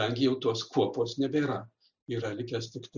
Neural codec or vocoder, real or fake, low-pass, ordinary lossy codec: none; real; 7.2 kHz; Opus, 64 kbps